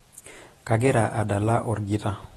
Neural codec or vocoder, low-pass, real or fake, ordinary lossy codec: vocoder, 48 kHz, 128 mel bands, Vocos; 19.8 kHz; fake; AAC, 32 kbps